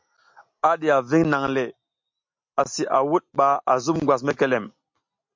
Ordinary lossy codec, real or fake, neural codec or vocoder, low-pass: MP3, 48 kbps; real; none; 7.2 kHz